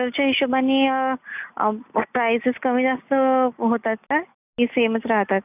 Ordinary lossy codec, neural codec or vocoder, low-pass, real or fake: none; none; 3.6 kHz; real